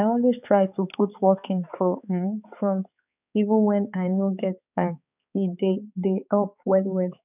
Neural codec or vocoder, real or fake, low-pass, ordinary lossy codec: codec, 16 kHz, 4 kbps, X-Codec, HuBERT features, trained on balanced general audio; fake; 3.6 kHz; none